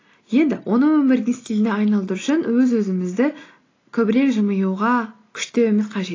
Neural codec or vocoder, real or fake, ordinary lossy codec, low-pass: none; real; AAC, 32 kbps; 7.2 kHz